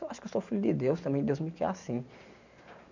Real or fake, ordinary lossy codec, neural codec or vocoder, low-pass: real; none; none; 7.2 kHz